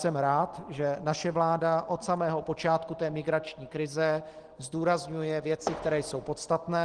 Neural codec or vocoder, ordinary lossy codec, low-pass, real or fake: none; Opus, 16 kbps; 9.9 kHz; real